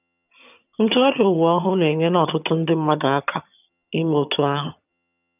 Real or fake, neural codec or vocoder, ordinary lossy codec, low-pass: fake; vocoder, 22.05 kHz, 80 mel bands, HiFi-GAN; none; 3.6 kHz